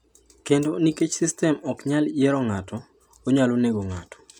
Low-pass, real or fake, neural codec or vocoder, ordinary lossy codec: 19.8 kHz; real; none; none